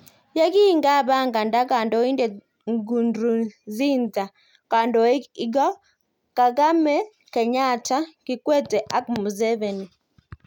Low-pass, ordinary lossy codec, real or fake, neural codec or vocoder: 19.8 kHz; none; real; none